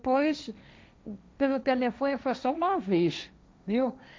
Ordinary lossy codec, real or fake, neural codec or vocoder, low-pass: none; fake; codec, 16 kHz, 1.1 kbps, Voila-Tokenizer; none